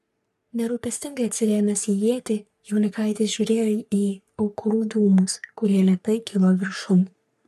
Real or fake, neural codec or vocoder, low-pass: fake; codec, 44.1 kHz, 3.4 kbps, Pupu-Codec; 14.4 kHz